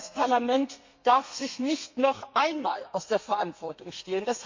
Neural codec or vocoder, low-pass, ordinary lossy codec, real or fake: codec, 32 kHz, 1.9 kbps, SNAC; 7.2 kHz; MP3, 48 kbps; fake